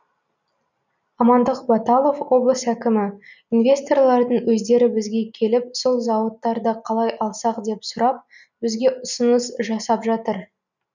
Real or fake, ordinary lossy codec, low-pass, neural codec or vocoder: real; none; 7.2 kHz; none